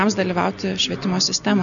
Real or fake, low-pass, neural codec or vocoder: real; 7.2 kHz; none